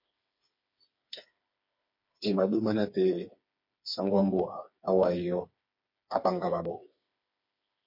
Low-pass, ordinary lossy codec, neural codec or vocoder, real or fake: 5.4 kHz; MP3, 32 kbps; codec, 16 kHz, 4 kbps, FreqCodec, smaller model; fake